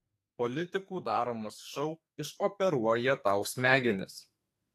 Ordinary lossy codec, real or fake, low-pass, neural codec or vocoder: AAC, 64 kbps; fake; 14.4 kHz; codec, 44.1 kHz, 2.6 kbps, SNAC